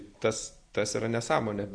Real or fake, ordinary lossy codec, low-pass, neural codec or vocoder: real; Opus, 64 kbps; 9.9 kHz; none